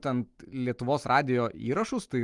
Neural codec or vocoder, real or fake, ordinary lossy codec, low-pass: none; real; Opus, 32 kbps; 10.8 kHz